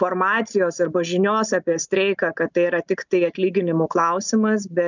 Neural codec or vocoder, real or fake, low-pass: none; real; 7.2 kHz